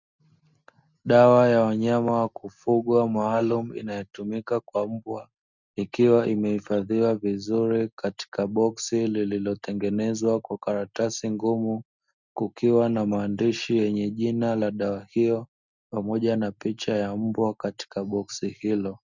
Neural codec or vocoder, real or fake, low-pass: none; real; 7.2 kHz